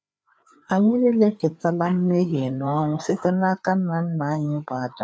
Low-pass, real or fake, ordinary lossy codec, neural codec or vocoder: none; fake; none; codec, 16 kHz, 4 kbps, FreqCodec, larger model